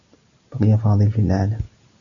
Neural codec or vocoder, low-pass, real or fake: none; 7.2 kHz; real